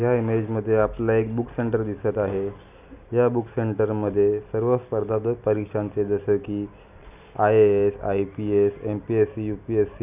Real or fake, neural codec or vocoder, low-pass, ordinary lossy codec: real; none; 3.6 kHz; none